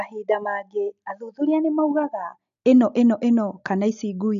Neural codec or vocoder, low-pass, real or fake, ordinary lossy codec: none; 7.2 kHz; real; none